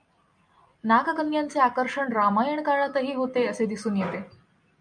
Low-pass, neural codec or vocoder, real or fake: 9.9 kHz; none; real